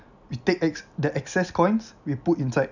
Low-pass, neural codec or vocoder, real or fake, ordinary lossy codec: 7.2 kHz; none; real; none